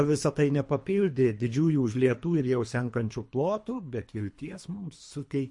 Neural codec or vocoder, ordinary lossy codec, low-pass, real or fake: codec, 24 kHz, 3 kbps, HILCodec; MP3, 48 kbps; 10.8 kHz; fake